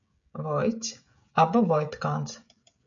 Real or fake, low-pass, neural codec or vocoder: fake; 7.2 kHz; codec, 16 kHz, 16 kbps, FreqCodec, smaller model